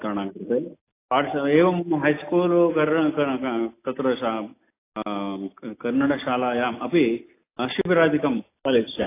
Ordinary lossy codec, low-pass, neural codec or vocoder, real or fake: AAC, 24 kbps; 3.6 kHz; none; real